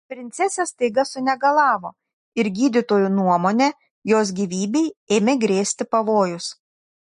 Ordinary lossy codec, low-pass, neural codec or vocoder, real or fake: MP3, 48 kbps; 10.8 kHz; none; real